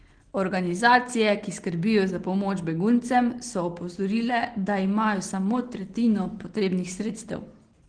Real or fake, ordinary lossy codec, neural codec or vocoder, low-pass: real; Opus, 16 kbps; none; 9.9 kHz